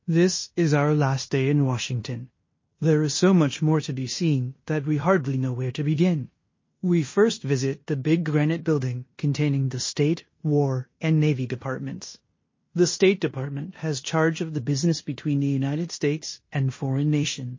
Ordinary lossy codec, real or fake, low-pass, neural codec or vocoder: MP3, 32 kbps; fake; 7.2 kHz; codec, 16 kHz in and 24 kHz out, 0.9 kbps, LongCat-Audio-Codec, fine tuned four codebook decoder